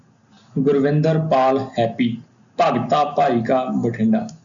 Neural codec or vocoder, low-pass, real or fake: none; 7.2 kHz; real